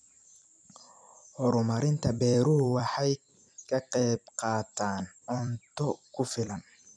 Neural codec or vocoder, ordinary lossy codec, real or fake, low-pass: vocoder, 44.1 kHz, 128 mel bands every 256 samples, BigVGAN v2; none; fake; 9.9 kHz